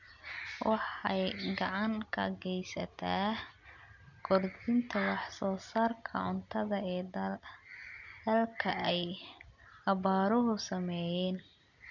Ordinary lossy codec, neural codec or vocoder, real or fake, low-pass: none; none; real; 7.2 kHz